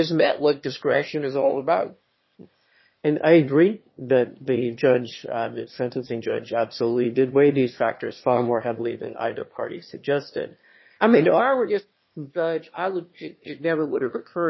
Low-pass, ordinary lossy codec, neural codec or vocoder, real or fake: 7.2 kHz; MP3, 24 kbps; autoencoder, 22.05 kHz, a latent of 192 numbers a frame, VITS, trained on one speaker; fake